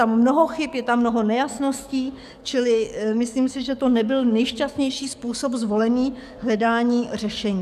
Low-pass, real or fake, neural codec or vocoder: 14.4 kHz; fake; codec, 44.1 kHz, 7.8 kbps, DAC